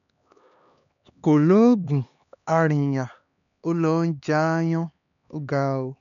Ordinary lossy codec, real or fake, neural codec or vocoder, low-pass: none; fake; codec, 16 kHz, 2 kbps, X-Codec, HuBERT features, trained on LibriSpeech; 7.2 kHz